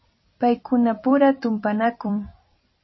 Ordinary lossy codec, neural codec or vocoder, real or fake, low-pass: MP3, 24 kbps; vocoder, 44.1 kHz, 128 mel bands every 256 samples, BigVGAN v2; fake; 7.2 kHz